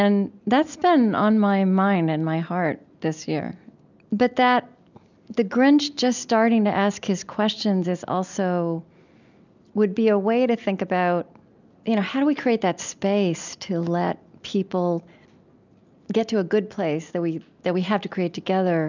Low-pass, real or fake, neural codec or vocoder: 7.2 kHz; real; none